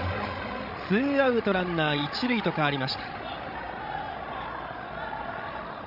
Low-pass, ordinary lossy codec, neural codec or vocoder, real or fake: 5.4 kHz; none; codec, 16 kHz, 16 kbps, FreqCodec, larger model; fake